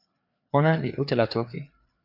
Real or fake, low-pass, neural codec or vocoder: fake; 5.4 kHz; codec, 16 kHz, 4 kbps, FreqCodec, larger model